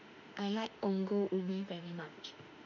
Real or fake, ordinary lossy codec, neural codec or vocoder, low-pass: fake; none; autoencoder, 48 kHz, 32 numbers a frame, DAC-VAE, trained on Japanese speech; 7.2 kHz